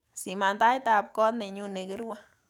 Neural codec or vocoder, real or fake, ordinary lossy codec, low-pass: codec, 44.1 kHz, 7.8 kbps, DAC; fake; none; 19.8 kHz